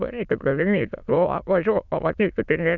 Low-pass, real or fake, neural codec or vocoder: 7.2 kHz; fake; autoencoder, 22.05 kHz, a latent of 192 numbers a frame, VITS, trained on many speakers